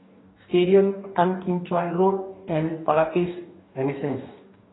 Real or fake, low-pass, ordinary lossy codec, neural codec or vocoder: fake; 7.2 kHz; AAC, 16 kbps; codec, 44.1 kHz, 2.6 kbps, DAC